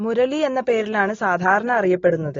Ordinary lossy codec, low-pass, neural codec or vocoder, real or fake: AAC, 32 kbps; 7.2 kHz; none; real